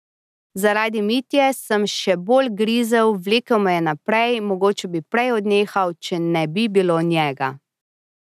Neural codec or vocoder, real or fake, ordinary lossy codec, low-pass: none; real; none; 14.4 kHz